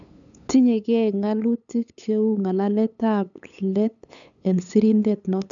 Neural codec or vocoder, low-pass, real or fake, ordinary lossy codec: codec, 16 kHz, 8 kbps, FunCodec, trained on LibriTTS, 25 frames a second; 7.2 kHz; fake; none